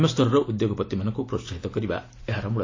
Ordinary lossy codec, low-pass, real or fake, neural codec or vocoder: AAC, 32 kbps; 7.2 kHz; real; none